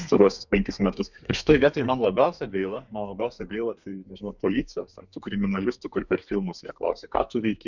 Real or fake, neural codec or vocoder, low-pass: fake; codec, 32 kHz, 1.9 kbps, SNAC; 7.2 kHz